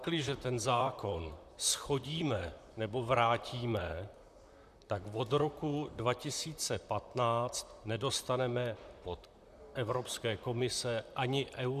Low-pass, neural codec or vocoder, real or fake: 14.4 kHz; vocoder, 44.1 kHz, 128 mel bands, Pupu-Vocoder; fake